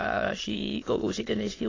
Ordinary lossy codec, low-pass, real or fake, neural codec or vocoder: AAC, 32 kbps; 7.2 kHz; fake; autoencoder, 22.05 kHz, a latent of 192 numbers a frame, VITS, trained on many speakers